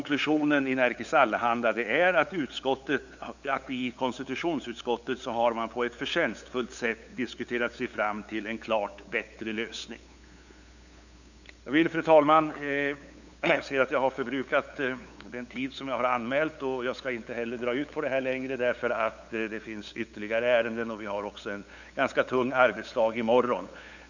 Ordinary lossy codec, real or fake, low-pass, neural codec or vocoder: none; fake; 7.2 kHz; codec, 16 kHz, 8 kbps, FunCodec, trained on LibriTTS, 25 frames a second